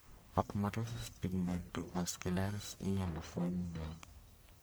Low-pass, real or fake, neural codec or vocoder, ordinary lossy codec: none; fake; codec, 44.1 kHz, 1.7 kbps, Pupu-Codec; none